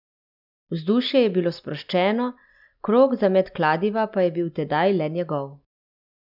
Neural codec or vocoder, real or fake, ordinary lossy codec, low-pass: none; real; none; 5.4 kHz